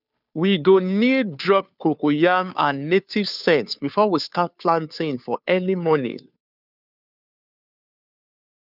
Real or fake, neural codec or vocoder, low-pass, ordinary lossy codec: fake; codec, 16 kHz, 2 kbps, FunCodec, trained on Chinese and English, 25 frames a second; 5.4 kHz; none